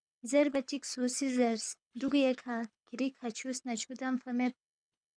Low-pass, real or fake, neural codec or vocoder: 9.9 kHz; fake; codec, 24 kHz, 6 kbps, HILCodec